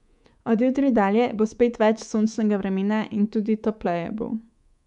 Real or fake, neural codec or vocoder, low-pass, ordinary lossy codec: fake; codec, 24 kHz, 3.1 kbps, DualCodec; 10.8 kHz; none